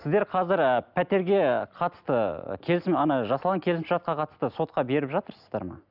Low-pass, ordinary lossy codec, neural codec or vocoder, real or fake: 5.4 kHz; none; none; real